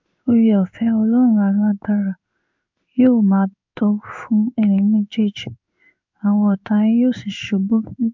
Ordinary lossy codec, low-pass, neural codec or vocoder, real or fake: MP3, 64 kbps; 7.2 kHz; codec, 16 kHz in and 24 kHz out, 1 kbps, XY-Tokenizer; fake